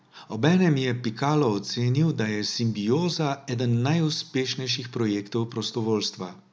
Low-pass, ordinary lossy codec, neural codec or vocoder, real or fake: none; none; none; real